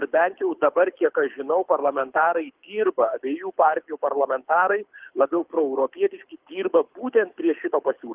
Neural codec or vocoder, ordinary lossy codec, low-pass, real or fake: codec, 24 kHz, 6 kbps, HILCodec; Opus, 32 kbps; 3.6 kHz; fake